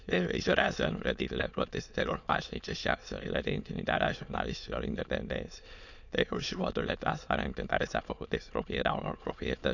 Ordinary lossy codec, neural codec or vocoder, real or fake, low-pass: none; autoencoder, 22.05 kHz, a latent of 192 numbers a frame, VITS, trained on many speakers; fake; 7.2 kHz